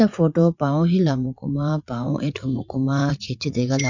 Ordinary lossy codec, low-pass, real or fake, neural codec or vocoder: none; 7.2 kHz; fake; vocoder, 44.1 kHz, 80 mel bands, Vocos